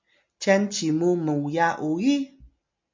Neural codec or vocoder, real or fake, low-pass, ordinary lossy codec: none; real; 7.2 kHz; MP3, 64 kbps